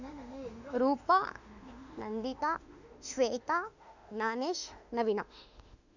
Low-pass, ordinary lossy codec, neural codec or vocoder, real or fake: 7.2 kHz; none; autoencoder, 48 kHz, 32 numbers a frame, DAC-VAE, trained on Japanese speech; fake